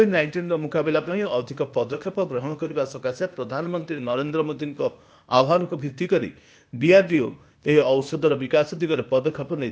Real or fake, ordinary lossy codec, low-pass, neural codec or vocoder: fake; none; none; codec, 16 kHz, 0.8 kbps, ZipCodec